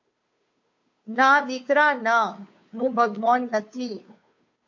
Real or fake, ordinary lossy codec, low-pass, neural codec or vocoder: fake; MP3, 48 kbps; 7.2 kHz; codec, 16 kHz, 2 kbps, FunCodec, trained on Chinese and English, 25 frames a second